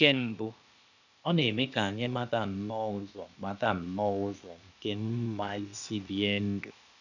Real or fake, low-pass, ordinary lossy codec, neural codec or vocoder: fake; 7.2 kHz; none; codec, 16 kHz, 0.8 kbps, ZipCodec